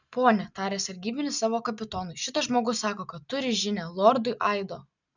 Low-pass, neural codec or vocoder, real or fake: 7.2 kHz; none; real